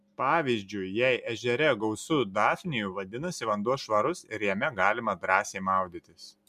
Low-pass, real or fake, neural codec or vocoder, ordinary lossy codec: 14.4 kHz; real; none; MP3, 96 kbps